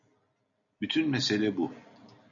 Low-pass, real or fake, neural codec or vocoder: 7.2 kHz; real; none